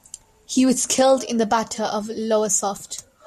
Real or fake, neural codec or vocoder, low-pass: real; none; 14.4 kHz